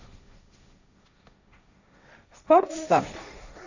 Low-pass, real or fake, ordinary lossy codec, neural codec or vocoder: 7.2 kHz; fake; none; codec, 16 kHz, 1.1 kbps, Voila-Tokenizer